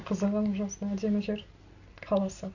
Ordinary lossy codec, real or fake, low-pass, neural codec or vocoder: none; real; 7.2 kHz; none